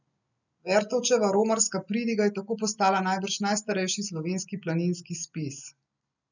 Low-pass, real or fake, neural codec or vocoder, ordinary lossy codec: 7.2 kHz; real; none; none